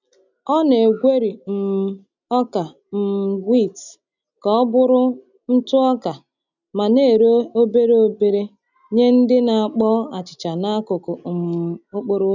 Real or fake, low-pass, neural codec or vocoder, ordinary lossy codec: real; 7.2 kHz; none; none